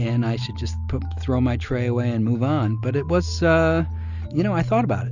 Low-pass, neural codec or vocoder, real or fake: 7.2 kHz; none; real